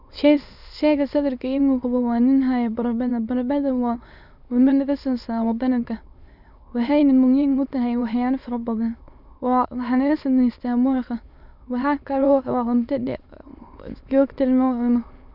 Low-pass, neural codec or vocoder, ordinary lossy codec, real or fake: 5.4 kHz; autoencoder, 22.05 kHz, a latent of 192 numbers a frame, VITS, trained on many speakers; none; fake